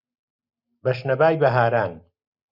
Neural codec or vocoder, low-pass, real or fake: none; 5.4 kHz; real